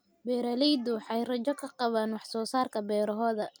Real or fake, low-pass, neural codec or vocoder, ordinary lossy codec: real; none; none; none